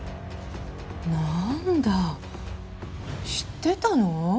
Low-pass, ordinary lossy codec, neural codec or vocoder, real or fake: none; none; none; real